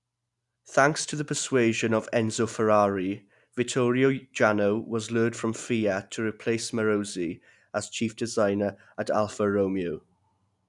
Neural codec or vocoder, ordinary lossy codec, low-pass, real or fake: none; none; 10.8 kHz; real